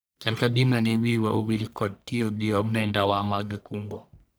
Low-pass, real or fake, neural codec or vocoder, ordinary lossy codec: none; fake; codec, 44.1 kHz, 1.7 kbps, Pupu-Codec; none